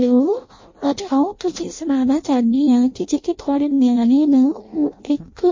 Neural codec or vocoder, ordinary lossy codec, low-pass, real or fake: codec, 16 kHz in and 24 kHz out, 0.6 kbps, FireRedTTS-2 codec; MP3, 32 kbps; 7.2 kHz; fake